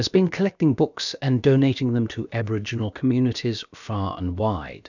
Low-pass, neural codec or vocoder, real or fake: 7.2 kHz; codec, 16 kHz, about 1 kbps, DyCAST, with the encoder's durations; fake